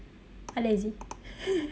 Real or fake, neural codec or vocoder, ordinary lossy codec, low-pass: real; none; none; none